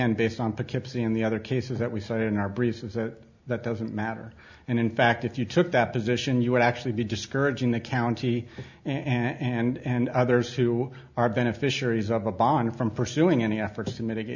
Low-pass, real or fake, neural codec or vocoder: 7.2 kHz; real; none